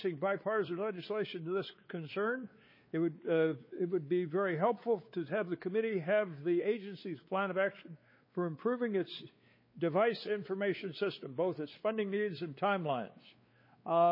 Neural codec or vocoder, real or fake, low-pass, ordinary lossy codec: codec, 16 kHz, 4 kbps, X-Codec, WavLM features, trained on Multilingual LibriSpeech; fake; 5.4 kHz; MP3, 24 kbps